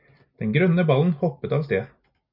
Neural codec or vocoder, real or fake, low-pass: none; real; 5.4 kHz